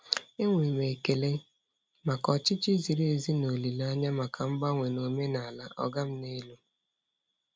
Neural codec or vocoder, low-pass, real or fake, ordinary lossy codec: none; none; real; none